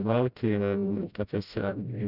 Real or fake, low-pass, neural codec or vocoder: fake; 5.4 kHz; codec, 16 kHz, 0.5 kbps, FreqCodec, smaller model